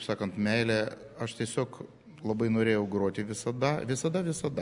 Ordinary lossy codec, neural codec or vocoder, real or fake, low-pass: MP3, 96 kbps; none; real; 10.8 kHz